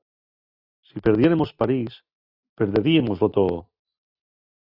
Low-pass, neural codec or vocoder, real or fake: 5.4 kHz; none; real